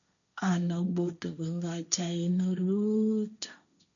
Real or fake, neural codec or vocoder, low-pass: fake; codec, 16 kHz, 1.1 kbps, Voila-Tokenizer; 7.2 kHz